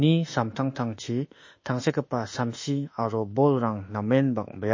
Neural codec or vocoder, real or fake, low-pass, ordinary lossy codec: autoencoder, 48 kHz, 32 numbers a frame, DAC-VAE, trained on Japanese speech; fake; 7.2 kHz; MP3, 32 kbps